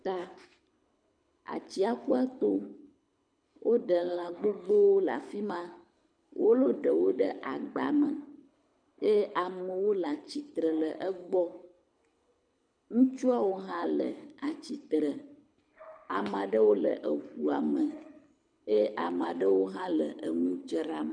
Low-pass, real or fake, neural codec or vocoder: 9.9 kHz; fake; codec, 24 kHz, 6 kbps, HILCodec